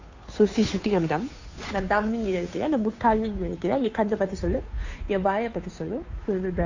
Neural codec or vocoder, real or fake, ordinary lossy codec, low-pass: codec, 16 kHz, 2 kbps, FunCodec, trained on Chinese and English, 25 frames a second; fake; AAC, 48 kbps; 7.2 kHz